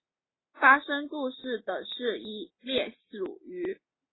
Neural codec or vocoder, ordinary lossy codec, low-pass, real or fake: none; AAC, 16 kbps; 7.2 kHz; real